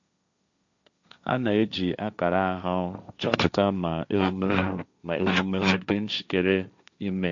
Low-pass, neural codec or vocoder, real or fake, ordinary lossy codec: 7.2 kHz; codec, 16 kHz, 1.1 kbps, Voila-Tokenizer; fake; none